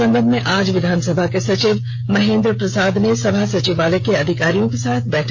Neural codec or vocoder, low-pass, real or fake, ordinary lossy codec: codec, 16 kHz, 16 kbps, FreqCodec, smaller model; none; fake; none